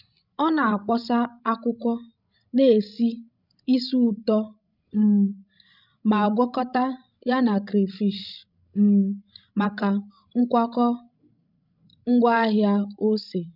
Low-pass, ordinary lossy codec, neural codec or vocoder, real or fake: 5.4 kHz; none; codec, 16 kHz, 16 kbps, FreqCodec, larger model; fake